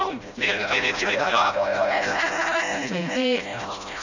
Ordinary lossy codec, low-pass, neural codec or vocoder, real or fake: none; 7.2 kHz; codec, 16 kHz, 0.5 kbps, FreqCodec, smaller model; fake